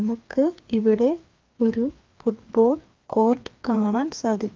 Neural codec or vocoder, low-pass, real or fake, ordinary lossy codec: codec, 16 kHz, 2 kbps, FreqCodec, larger model; 7.2 kHz; fake; Opus, 32 kbps